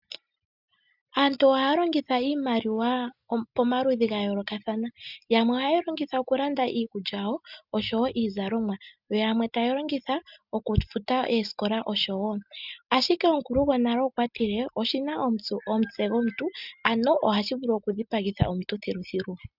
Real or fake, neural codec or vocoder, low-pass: real; none; 5.4 kHz